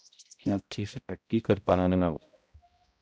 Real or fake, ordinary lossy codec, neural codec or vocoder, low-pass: fake; none; codec, 16 kHz, 0.5 kbps, X-Codec, HuBERT features, trained on balanced general audio; none